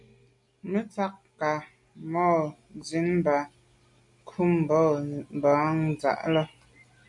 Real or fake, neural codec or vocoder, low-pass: real; none; 10.8 kHz